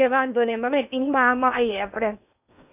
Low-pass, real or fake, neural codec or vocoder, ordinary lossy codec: 3.6 kHz; fake; codec, 16 kHz in and 24 kHz out, 0.6 kbps, FocalCodec, streaming, 2048 codes; none